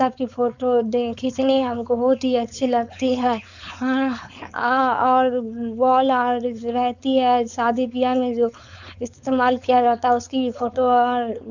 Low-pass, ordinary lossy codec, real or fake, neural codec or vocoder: 7.2 kHz; none; fake; codec, 16 kHz, 4.8 kbps, FACodec